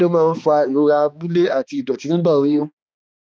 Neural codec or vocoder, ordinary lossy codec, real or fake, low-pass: codec, 16 kHz, 2 kbps, X-Codec, HuBERT features, trained on balanced general audio; none; fake; none